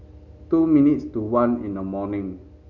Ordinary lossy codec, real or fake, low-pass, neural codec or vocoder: none; real; 7.2 kHz; none